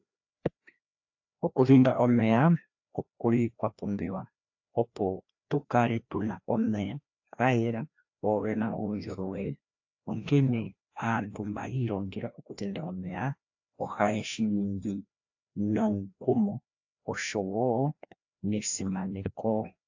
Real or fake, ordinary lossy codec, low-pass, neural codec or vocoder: fake; AAC, 48 kbps; 7.2 kHz; codec, 16 kHz, 1 kbps, FreqCodec, larger model